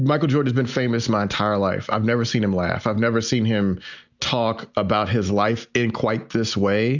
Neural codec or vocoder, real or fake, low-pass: none; real; 7.2 kHz